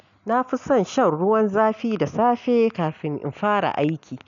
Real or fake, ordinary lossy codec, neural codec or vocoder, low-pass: real; none; none; 7.2 kHz